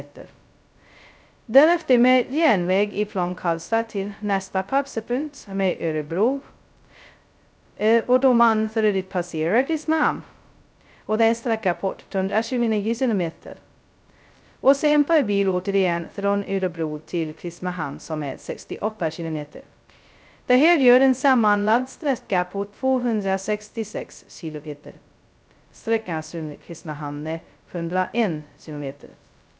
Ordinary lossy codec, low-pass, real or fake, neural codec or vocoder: none; none; fake; codec, 16 kHz, 0.2 kbps, FocalCodec